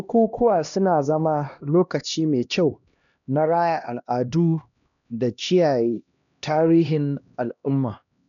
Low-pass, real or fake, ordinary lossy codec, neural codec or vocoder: 7.2 kHz; fake; none; codec, 16 kHz, 1 kbps, X-Codec, HuBERT features, trained on LibriSpeech